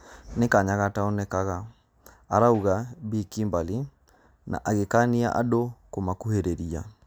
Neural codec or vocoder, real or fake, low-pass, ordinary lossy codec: none; real; none; none